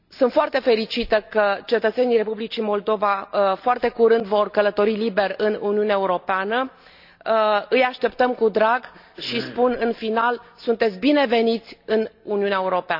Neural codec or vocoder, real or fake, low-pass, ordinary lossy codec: none; real; 5.4 kHz; none